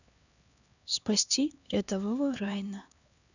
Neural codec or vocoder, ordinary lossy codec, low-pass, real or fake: codec, 16 kHz, 2 kbps, X-Codec, HuBERT features, trained on LibriSpeech; none; 7.2 kHz; fake